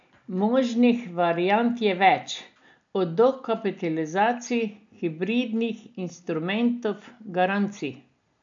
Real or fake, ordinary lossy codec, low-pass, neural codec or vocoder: real; AAC, 64 kbps; 7.2 kHz; none